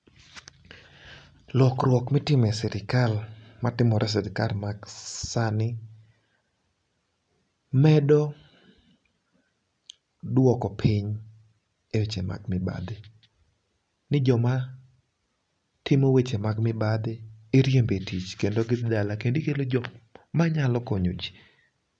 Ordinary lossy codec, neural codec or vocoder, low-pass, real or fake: none; none; 9.9 kHz; real